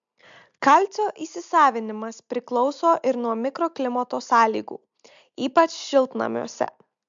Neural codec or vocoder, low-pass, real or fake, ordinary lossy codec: none; 7.2 kHz; real; MP3, 64 kbps